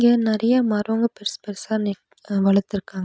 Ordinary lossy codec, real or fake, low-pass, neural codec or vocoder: none; real; none; none